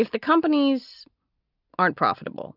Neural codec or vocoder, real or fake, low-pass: none; real; 5.4 kHz